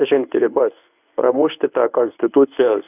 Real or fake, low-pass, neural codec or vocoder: fake; 3.6 kHz; codec, 24 kHz, 0.9 kbps, WavTokenizer, medium speech release version 1